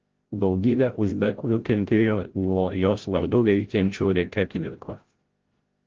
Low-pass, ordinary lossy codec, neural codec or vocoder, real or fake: 7.2 kHz; Opus, 16 kbps; codec, 16 kHz, 0.5 kbps, FreqCodec, larger model; fake